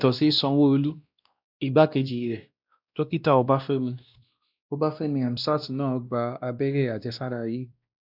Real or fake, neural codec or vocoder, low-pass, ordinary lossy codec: fake; codec, 16 kHz, 1 kbps, X-Codec, WavLM features, trained on Multilingual LibriSpeech; 5.4 kHz; none